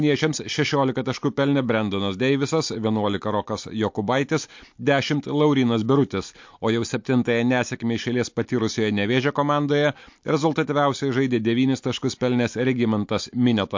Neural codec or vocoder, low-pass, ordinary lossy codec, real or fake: none; 7.2 kHz; MP3, 48 kbps; real